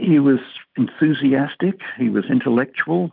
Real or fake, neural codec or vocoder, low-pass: real; none; 5.4 kHz